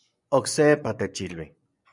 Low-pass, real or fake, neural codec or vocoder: 10.8 kHz; fake; vocoder, 24 kHz, 100 mel bands, Vocos